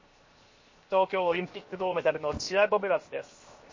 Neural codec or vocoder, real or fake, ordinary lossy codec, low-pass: codec, 16 kHz, 0.7 kbps, FocalCodec; fake; MP3, 32 kbps; 7.2 kHz